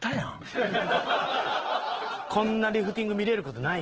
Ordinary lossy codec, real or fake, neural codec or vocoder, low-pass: Opus, 16 kbps; real; none; 7.2 kHz